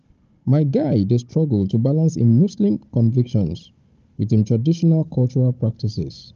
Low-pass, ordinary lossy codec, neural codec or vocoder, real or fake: 7.2 kHz; Opus, 24 kbps; codec, 16 kHz, 16 kbps, FunCodec, trained on Chinese and English, 50 frames a second; fake